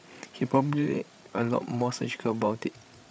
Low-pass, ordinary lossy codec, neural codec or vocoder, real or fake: none; none; codec, 16 kHz, 16 kbps, FreqCodec, larger model; fake